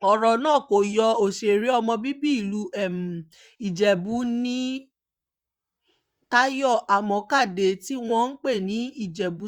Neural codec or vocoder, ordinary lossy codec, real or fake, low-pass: vocoder, 44.1 kHz, 128 mel bands, Pupu-Vocoder; Opus, 64 kbps; fake; 19.8 kHz